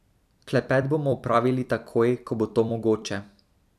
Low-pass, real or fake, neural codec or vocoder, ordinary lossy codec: 14.4 kHz; fake; vocoder, 44.1 kHz, 128 mel bands every 256 samples, BigVGAN v2; none